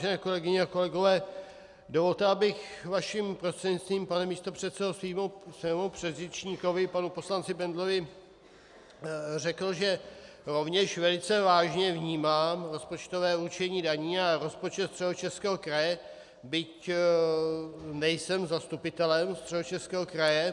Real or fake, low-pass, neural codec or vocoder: real; 10.8 kHz; none